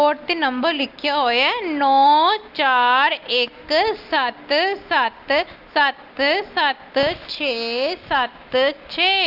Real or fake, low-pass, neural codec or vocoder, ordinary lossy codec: real; 5.4 kHz; none; Opus, 32 kbps